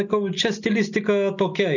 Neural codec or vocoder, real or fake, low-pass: none; real; 7.2 kHz